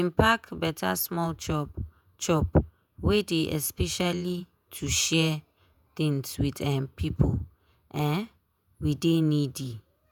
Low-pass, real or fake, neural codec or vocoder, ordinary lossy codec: none; fake; vocoder, 48 kHz, 128 mel bands, Vocos; none